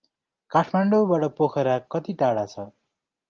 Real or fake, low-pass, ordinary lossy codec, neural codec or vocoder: real; 7.2 kHz; Opus, 24 kbps; none